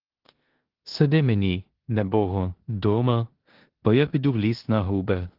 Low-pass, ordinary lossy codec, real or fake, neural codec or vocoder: 5.4 kHz; Opus, 16 kbps; fake; codec, 16 kHz in and 24 kHz out, 0.9 kbps, LongCat-Audio-Codec, four codebook decoder